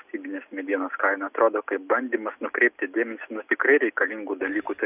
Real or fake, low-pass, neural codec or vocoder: real; 3.6 kHz; none